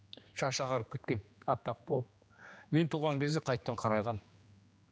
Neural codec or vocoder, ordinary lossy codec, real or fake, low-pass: codec, 16 kHz, 2 kbps, X-Codec, HuBERT features, trained on general audio; none; fake; none